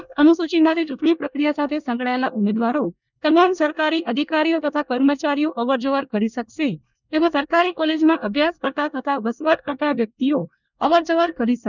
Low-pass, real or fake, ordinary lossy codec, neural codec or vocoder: 7.2 kHz; fake; none; codec, 24 kHz, 1 kbps, SNAC